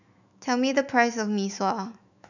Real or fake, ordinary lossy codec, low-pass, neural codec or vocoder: fake; none; 7.2 kHz; autoencoder, 48 kHz, 128 numbers a frame, DAC-VAE, trained on Japanese speech